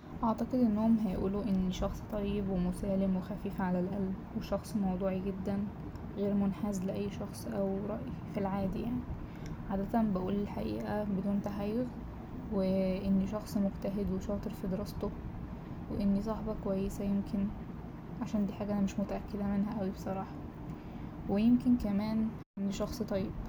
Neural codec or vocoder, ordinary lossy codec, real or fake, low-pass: none; none; real; none